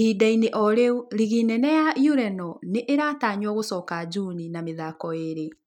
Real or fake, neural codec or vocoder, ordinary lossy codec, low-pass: real; none; none; 19.8 kHz